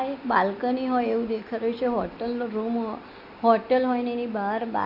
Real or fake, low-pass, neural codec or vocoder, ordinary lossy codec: real; 5.4 kHz; none; none